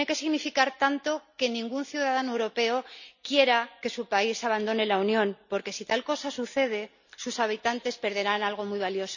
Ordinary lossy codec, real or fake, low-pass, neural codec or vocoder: none; real; 7.2 kHz; none